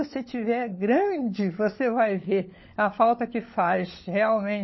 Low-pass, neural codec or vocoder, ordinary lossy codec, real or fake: 7.2 kHz; codec, 16 kHz, 16 kbps, FunCodec, trained on LibriTTS, 50 frames a second; MP3, 24 kbps; fake